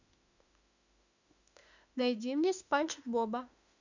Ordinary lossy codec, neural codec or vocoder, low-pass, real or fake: none; autoencoder, 48 kHz, 32 numbers a frame, DAC-VAE, trained on Japanese speech; 7.2 kHz; fake